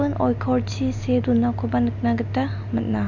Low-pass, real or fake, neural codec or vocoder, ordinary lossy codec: 7.2 kHz; real; none; none